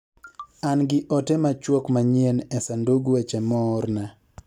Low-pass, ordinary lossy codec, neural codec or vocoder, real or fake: 19.8 kHz; none; none; real